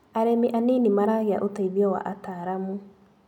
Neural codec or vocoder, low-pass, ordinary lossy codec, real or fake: none; 19.8 kHz; none; real